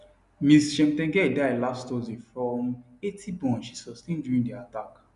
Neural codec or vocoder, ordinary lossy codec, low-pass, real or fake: none; none; 10.8 kHz; real